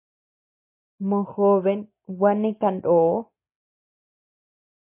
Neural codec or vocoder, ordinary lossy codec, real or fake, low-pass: none; MP3, 24 kbps; real; 3.6 kHz